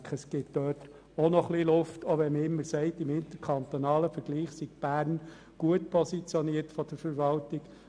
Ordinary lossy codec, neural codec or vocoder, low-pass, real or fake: none; none; 9.9 kHz; real